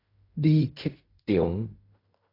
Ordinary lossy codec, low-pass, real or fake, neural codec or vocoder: AAC, 32 kbps; 5.4 kHz; fake; codec, 16 kHz in and 24 kHz out, 0.4 kbps, LongCat-Audio-Codec, fine tuned four codebook decoder